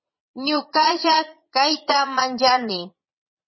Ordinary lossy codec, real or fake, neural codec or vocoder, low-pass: MP3, 24 kbps; fake; vocoder, 22.05 kHz, 80 mel bands, Vocos; 7.2 kHz